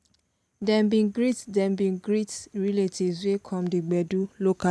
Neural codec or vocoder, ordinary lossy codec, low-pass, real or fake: none; none; none; real